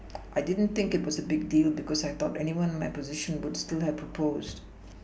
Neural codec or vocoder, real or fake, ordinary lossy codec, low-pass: none; real; none; none